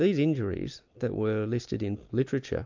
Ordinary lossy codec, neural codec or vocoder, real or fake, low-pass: MP3, 64 kbps; none; real; 7.2 kHz